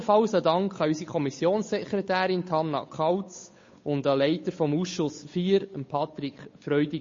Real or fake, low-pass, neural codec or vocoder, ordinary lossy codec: fake; 7.2 kHz; codec, 16 kHz, 8 kbps, FunCodec, trained on Chinese and English, 25 frames a second; MP3, 32 kbps